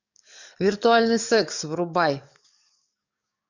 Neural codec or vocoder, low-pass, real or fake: codec, 44.1 kHz, 7.8 kbps, DAC; 7.2 kHz; fake